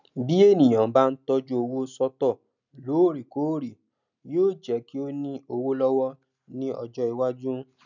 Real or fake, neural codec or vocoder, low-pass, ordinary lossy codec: real; none; 7.2 kHz; none